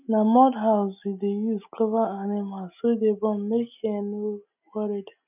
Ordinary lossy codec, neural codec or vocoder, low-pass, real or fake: none; none; 3.6 kHz; real